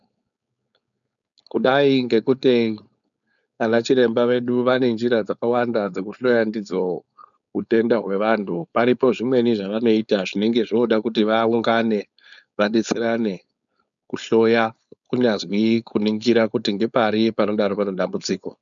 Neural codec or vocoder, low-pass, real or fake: codec, 16 kHz, 4.8 kbps, FACodec; 7.2 kHz; fake